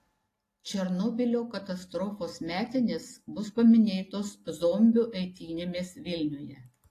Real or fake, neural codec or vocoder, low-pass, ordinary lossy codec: real; none; 14.4 kHz; AAC, 48 kbps